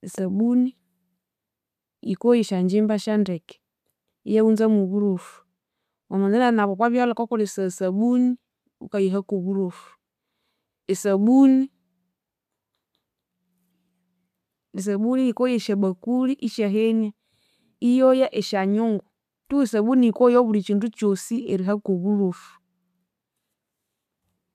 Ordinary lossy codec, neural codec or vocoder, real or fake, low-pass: none; none; real; 14.4 kHz